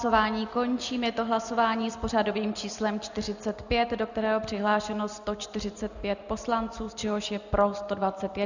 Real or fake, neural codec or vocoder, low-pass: real; none; 7.2 kHz